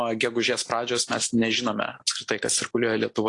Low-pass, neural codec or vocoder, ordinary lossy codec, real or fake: 10.8 kHz; none; AAC, 48 kbps; real